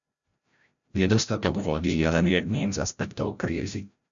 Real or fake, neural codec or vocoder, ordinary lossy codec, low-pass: fake; codec, 16 kHz, 0.5 kbps, FreqCodec, larger model; MP3, 96 kbps; 7.2 kHz